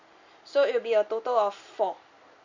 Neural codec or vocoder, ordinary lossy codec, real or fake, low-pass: none; MP3, 48 kbps; real; 7.2 kHz